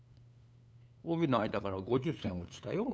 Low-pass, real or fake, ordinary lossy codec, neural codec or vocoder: none; fake; none; codec, 16 kHz, 8 kbps, FunCodec, trained on LibriTTS, 25 frames a second